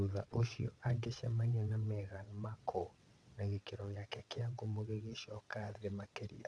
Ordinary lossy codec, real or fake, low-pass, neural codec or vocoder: none; fake; none; vocoder, 22.05 kHz, 80 mel bands, WaveNeXt